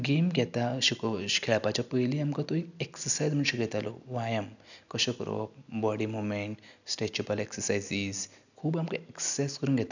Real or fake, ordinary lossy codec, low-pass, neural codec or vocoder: real; none; 7.2 kHz; none